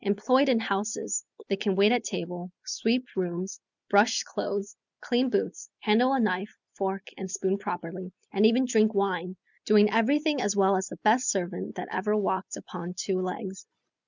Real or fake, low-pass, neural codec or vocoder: real; 7.2 kHz; none